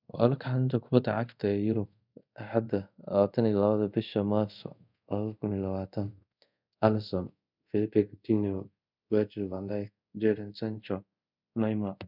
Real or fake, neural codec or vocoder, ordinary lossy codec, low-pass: fake; codec, 24 kHz, 0.5 kbps, DualCodec; none; 5.4 kHz